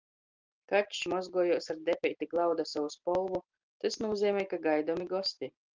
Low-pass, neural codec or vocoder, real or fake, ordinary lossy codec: 7.2 kHz; none; real; Opus, 32 kbps